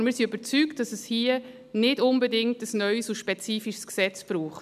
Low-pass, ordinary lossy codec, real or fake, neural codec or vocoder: 14.4 kHz; none; real; none